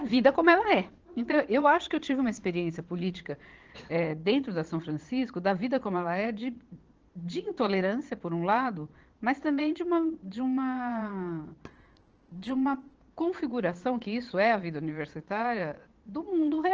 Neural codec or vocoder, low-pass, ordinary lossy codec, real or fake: vocoder, 22.05 kHz, 80 mel bands, Vocos; 7.2 kHz; Opus, 16 kbps; fake